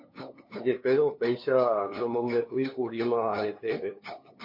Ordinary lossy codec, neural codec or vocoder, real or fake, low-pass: MP3, 32 kbps; codec, 16 kHz, 2 kbps, FunCodec, trained on LibriTTS, 25 frames a second; fake; 5.4 kHz